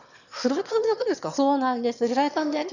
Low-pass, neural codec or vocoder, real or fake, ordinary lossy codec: 7.2 kHz; autoencoder, 22.05 kHz, a latent of 192 numbers a frame, VITS, trained on one speaker; fake; none